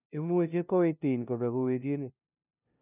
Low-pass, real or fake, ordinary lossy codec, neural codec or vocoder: 3.6 kHz; fake; none; codec, 16 kHz, 0.5 kbps, FunCodec, trained on LibriTTS, 25 frames a second